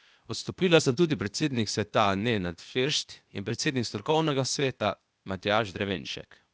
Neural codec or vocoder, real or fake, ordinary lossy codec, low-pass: codec, 16 kHz, 0.8 kbps, ZipCodec; fake; none; none